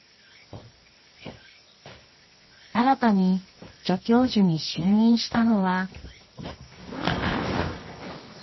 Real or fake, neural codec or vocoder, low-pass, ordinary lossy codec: fake; codec, 24 kHz, 0.9 kbps, WavTokenizer, medium music audio release; 7.2 kHz; MP3, 24 kbps